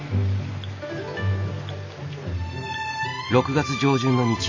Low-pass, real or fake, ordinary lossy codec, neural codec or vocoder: 7.2 kHz; real; AAC, 48 kbps; none